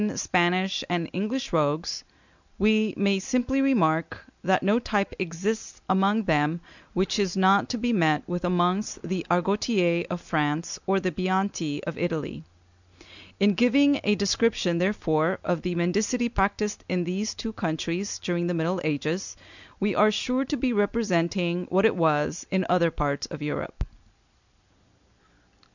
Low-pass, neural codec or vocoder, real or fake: 7.2 kHz; none; real